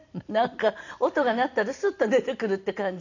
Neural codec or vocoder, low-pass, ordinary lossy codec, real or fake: none; 7.2 kHz; none; real